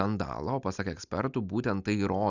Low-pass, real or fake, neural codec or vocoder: 7.2 kHz; real; none